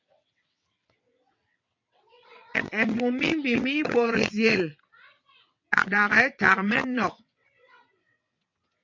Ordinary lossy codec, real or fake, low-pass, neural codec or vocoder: MP3, 48 kbps; fake; 7.2 kHz; vocoder, 22.05 kHz, 80 mel bands, WaveNeXt